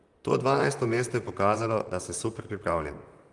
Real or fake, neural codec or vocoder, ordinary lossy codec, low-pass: fake; vocoder, 44.1 kHz, 128 mel bands, Pupu-Vocoder; Opus, 32 kbps; 10.8 kHz